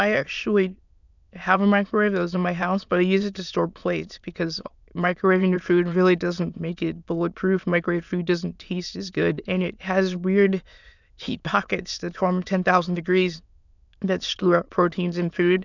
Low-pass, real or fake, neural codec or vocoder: 7.2 kHz; fake; autoencoder, 22.05 kHz, a latent of 192 numbers a frame, VITS, trained on many speakers